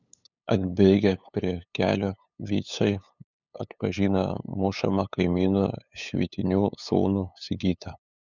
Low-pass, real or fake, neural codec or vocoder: 7.2 kHz; fake; codec, 16 kHz, 8 kbps, FunCodec, trained on LibriTTS, 25 frames a second